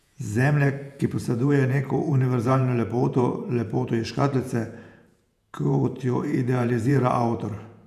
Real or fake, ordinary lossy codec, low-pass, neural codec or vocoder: fake; none; 14.4 kHz; vocoder, 48 kHz, 128 mel bands, Vocos